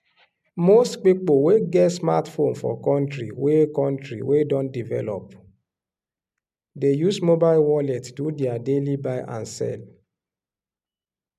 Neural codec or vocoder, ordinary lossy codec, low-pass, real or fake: none; MP3, 96 kbps; 14.4 kHz; real